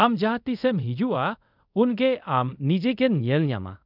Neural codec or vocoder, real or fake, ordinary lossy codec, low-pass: codec, 24 kHz, 0.5 kbps, DualCodec; fake; none; 5.4 kHz